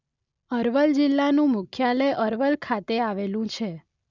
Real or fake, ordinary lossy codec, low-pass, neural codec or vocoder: real; none; 7.2 kHz; none